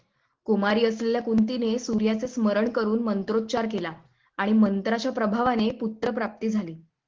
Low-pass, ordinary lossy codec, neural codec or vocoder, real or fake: 7.2 kHz; Opus, 16 kbps; none; real